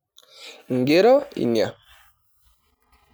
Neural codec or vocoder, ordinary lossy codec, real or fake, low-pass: none; none; real; none